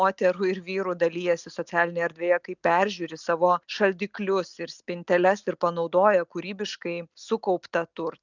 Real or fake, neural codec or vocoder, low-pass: real; none; 7.2 kHz